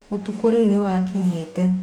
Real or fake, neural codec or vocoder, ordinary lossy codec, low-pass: fake; codec, 44.1 kHz, 2.6 kbps, DAC; none; 19.8 kHz